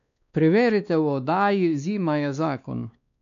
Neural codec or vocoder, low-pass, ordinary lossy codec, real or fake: codec, 16 kHz, 2 kbps, X-Codec, WavLM features, trained on Multilingual LibriSpeech; 7.2 kHz; MP3, 96 kbps; fake